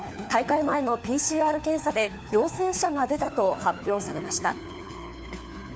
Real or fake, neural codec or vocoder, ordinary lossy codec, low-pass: fake; codec, 16 kHz, 4 kbps, FunCodec, trained on LibriTTS, 50 frames a second; none; none